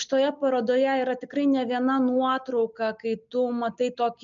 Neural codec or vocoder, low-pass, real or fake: none; 7.2 kHz; real